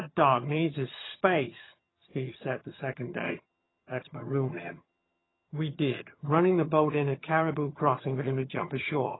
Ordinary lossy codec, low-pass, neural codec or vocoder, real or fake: AAC, 16 kbps; 7.2 kHz; vocoder, 22.05 kHz, 80 mel bands, HiFi-GAN; fake